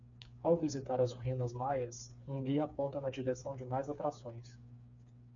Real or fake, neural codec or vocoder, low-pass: fake; codec, 16 kHz, 4 kbps, FreqCodec, smaller model; 7.2 kHz